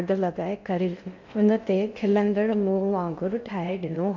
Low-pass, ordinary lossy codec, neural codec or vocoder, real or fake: 7.2 kHz; MP3, 64 kbps; codec, 16 kHz in and 24 kHz out, 0.6 kbps, FocalCodec, streaming, 2048 codes; fake